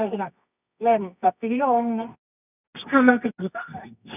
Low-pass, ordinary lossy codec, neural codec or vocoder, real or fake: 3.6 kHz; none; codec, 24 kHz, 0.9 kbps, WavTokenizer, medium music audio release; fake